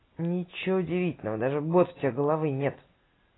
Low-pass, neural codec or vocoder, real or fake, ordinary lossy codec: 7.2 kHz; none; real; AAC, 16 kbps